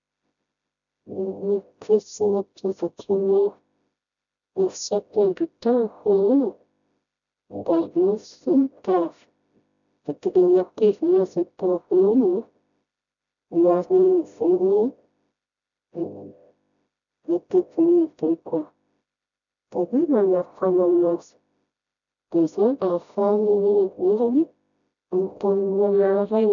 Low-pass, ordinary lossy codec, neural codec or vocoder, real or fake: 7.2 kHz; none; codec, 16 kHz, 0.5 kbps, FreqCodec, smaller model; fake